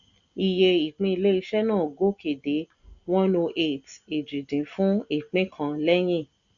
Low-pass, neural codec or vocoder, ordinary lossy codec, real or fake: 7.2 kHz; none; none; real